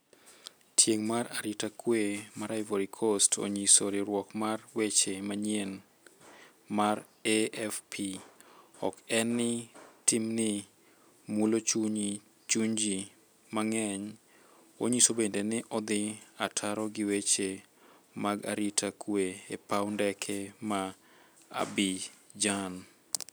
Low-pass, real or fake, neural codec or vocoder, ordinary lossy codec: none; real; none; none